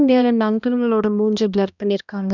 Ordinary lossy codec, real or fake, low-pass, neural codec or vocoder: none; fake; 7.2 kHz; codec, 16 kHz, 1 kbps, X-Codec, HuBERT features, trained on balanced general audio